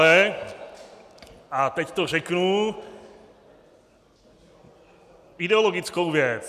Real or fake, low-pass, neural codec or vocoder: real; 14.4 kHz; none